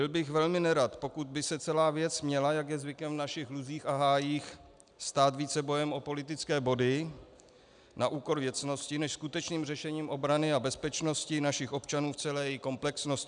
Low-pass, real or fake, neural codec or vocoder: 9.9 kHz; real; none